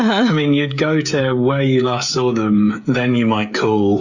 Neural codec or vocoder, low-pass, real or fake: codec, 16 kHz, 16 kbps, FreqCodec, smaller model; 7.2 kHz; fake